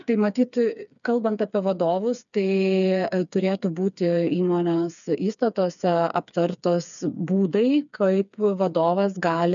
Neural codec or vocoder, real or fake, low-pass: codec, 16 kHz, 4 kbps, FreqCodec, smaller model; fake; 7.2 kHz